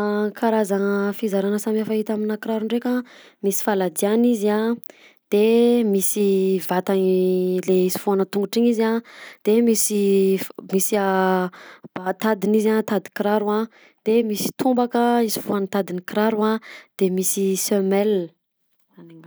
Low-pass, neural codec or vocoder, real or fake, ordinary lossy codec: none; none; real; none